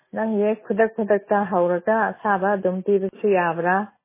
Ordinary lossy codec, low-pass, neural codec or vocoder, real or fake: MP3, 16 kbps; 3.6 kHz; none; real